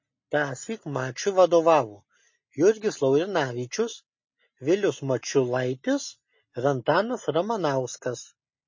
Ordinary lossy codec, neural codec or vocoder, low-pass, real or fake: MP3, 32 kbps; none; 7.2 kHz; real